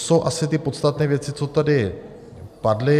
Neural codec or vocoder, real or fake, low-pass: none; real; 14.4 kHz